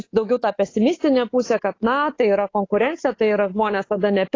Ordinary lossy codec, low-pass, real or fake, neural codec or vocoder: AAC, 32 kbps; 7.2 kHz; fake; codec, 24 kHz, 3.1 kbps, DualCodec